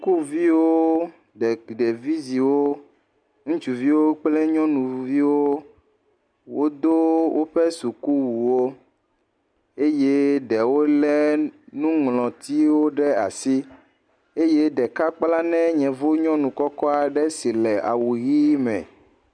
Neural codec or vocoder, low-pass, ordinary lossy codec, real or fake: none; 9.9 kHz; MP3, 96 kbps; real